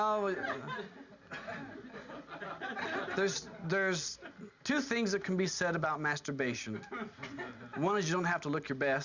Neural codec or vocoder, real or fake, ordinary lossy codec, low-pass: none; real; Opus, 64 kbps; 7.2 kHz